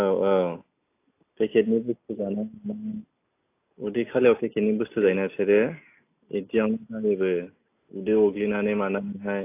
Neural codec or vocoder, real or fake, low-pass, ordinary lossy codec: none; real; 3.6 kHz; AAC, 24 kbps